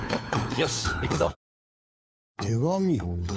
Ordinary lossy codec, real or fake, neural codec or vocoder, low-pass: none; fake; codec, 16 kHz, 4 kbps, FunCodec, trained on LibriTTS, 50 frames a second; none